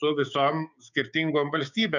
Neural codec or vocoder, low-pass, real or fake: codec, 16 kHz, 6 kbps, DAC; 7.2 kHz; fake